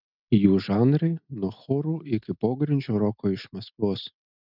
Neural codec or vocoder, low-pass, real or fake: none; 5.4 kHz; real